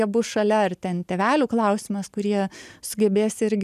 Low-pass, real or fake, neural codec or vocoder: 14.4 kHz; real; none